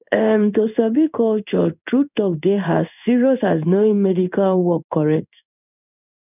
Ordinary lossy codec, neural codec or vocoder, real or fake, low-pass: none; codec, 16 kHz in and 24 kHz out, 1 kbps, XY-Tokenizer; fake; 3.6 kHz